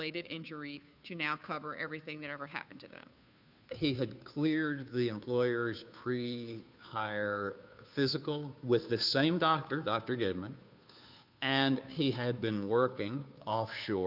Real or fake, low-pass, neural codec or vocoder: fake; 5.4 kHz; codec, 16 kHz, 2 kbps, FunCodec, trained on Chinese and English, 25 frames a second